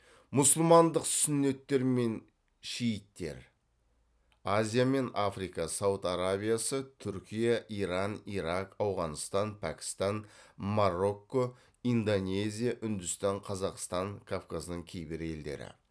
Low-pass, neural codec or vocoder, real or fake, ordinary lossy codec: none; none; real; none